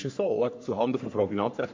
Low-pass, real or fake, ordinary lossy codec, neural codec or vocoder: 7.2 kHz; fake; MP3, 48 kbps; codec, 44.1 kHz, 3.4 kbps, Pupu-Codec